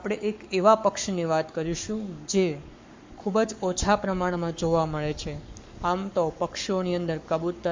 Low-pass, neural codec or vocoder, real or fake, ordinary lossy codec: 7.2 kHz; codec, 44.1 kHz, 7.8 kbps, Pupu-Codec; fake; MP3, 48 kbps